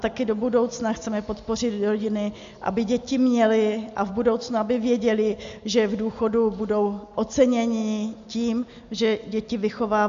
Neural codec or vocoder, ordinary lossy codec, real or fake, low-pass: none; MP3, 64 kbps; real; 7.2 kHz